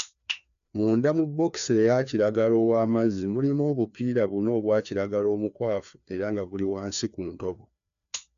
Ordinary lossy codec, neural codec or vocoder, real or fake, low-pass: none; codec, 16 kHz, 2 kbps, FreqCodec, larger model; fake; 7.2 kHz